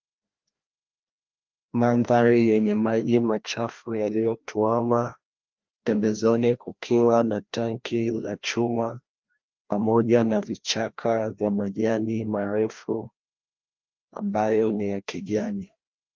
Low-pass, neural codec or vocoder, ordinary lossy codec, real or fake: 7.2 kHz; codec, 16 kHz, 1 kbps, FreqCodec, larger model; Opus, 32 kbps; fake